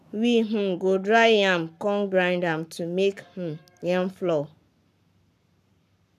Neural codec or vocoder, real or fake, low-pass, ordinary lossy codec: codec, 44.1 kHz, 7.8 kbps, Pupu-Codec; fake; 14.4 kHz; none